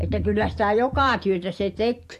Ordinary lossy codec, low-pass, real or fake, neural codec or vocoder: AAC, 64 kbps; 14.4 kHz; fake; vocoder, 44.1 kHz, 128 mel bands every 256 samples, BigVGAN v2